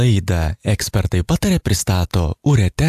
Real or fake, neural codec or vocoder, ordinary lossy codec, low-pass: real; none; AAC, 96 kbps; 14.4 kHz